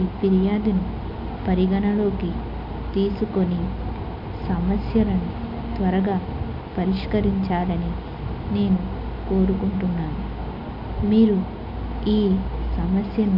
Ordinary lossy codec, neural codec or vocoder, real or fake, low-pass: none; none; real; 5.4 kHz